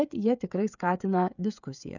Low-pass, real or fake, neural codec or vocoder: 7.2 kHz; fake; codec, 16 kHz, 16 kbps, FreqCodec, smaller model